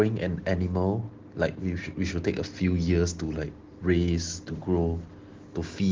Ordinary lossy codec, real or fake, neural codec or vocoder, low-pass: Opus, 16 kbps; real; none; 7.2 kHz